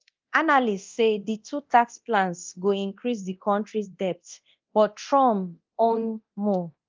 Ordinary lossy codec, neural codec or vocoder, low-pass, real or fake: Opus, 24 kbps; codec, 24 kHz, 0.9 kbps, DualCodec; 7.2 kHz; fake